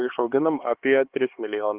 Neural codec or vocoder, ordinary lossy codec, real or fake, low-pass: codec, 16 kHz, 4 kbps, X-Codec, HuBERT features, trained on LibriSpeech; Opus, 16 kbps; fake; 3.6 kHz